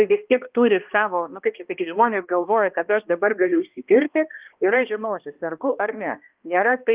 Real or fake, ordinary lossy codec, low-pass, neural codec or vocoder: fake; Opus, 32 kbps; 3.6 kHz; codec, 16 kHz, 1 kbps, X-Codec, HuBERT features, trained on balanced general audio